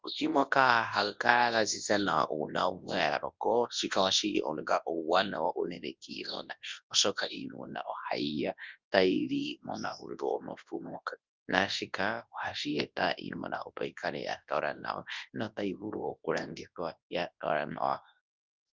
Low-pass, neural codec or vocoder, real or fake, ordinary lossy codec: 7.2 kHz; codec, 24 kHz, 0.9 kbps, WavTokenizer, large speech release; fake; Opus, 32 kbps